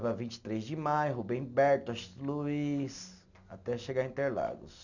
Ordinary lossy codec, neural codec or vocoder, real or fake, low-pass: none; none; real; 7.2 kHz